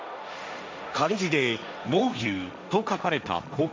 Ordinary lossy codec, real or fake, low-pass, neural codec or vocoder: none; fake; none; codec, 16 kHz, 1.1 kbps, Voila-Tokenizer